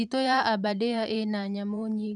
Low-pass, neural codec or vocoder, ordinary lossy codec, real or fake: 10.8 kHz; vocoder, 44.1 kHz, 128 mel bands every 512 samples, BigVGAN v2; none; fake